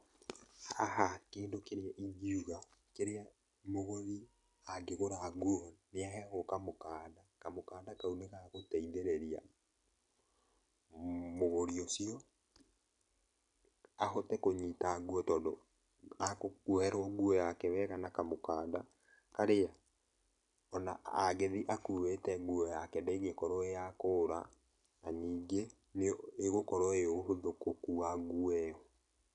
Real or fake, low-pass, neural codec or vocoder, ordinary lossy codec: real; 10.8 kHz; none; none